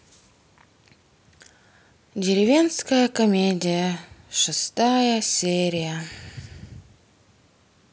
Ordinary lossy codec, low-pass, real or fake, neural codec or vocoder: none; none; real; none